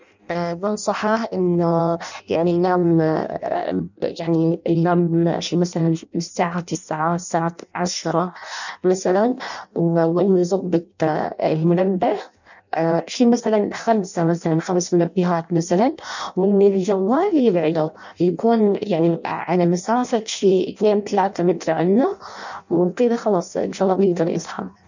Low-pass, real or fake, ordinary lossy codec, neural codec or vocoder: 7.2 kHz; fake; none; codec, 16 kHz in and 24 kHz out, 0.6 kbps, FireRedTTS-2 codec